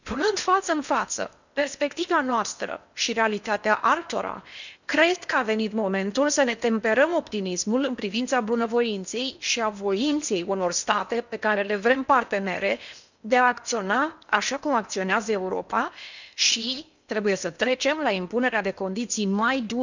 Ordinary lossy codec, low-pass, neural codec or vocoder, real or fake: none; 7.2 kHz; codec, 16 kHz in and 24 kHz out, 0.8 kbps, FocalCodec, streaming, 65536 codes; fake